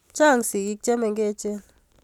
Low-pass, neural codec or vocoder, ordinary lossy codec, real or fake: 19.8 kHz; none; none; real